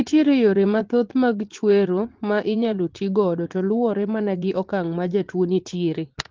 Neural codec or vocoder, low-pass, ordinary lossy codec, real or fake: vocoder, 44.1 kHz, 80 mel bands, Vocos; 7.2 kHz; Opus, 16 kbps; fake